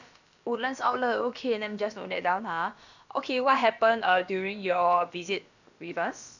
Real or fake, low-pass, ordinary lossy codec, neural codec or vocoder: fake; 7.2 kHz; none; codec, 16 kHz, about 1 kbps, DyCAST, with the encoder's durations